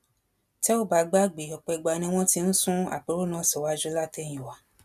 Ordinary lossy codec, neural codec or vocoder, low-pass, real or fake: none; none; 14.4 kHz; real